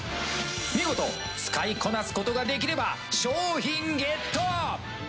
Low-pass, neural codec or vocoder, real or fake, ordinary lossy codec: none; none; real; none